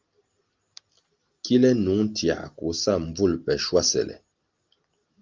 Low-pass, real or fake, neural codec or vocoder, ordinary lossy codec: 7.2 kHz; real; none; Opus, 32 kbps